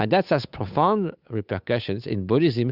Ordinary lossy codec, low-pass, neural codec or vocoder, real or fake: Opus, 64 kbps; 5.4 kHz; codec, 16 kHz, 8 kbps, FunCodec, trained on Chinese and English, 25 frames a second; fake